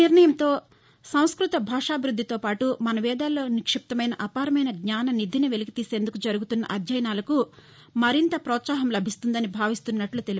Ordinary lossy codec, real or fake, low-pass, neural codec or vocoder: none; real; none; none